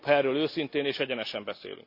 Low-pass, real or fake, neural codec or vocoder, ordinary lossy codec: 5.4 kHz; real; none; none